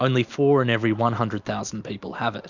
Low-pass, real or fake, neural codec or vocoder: 7.2 kHz; real; none